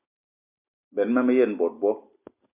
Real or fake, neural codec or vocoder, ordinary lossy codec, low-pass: real; none; MP3, 32 kbps; 3.6 kHz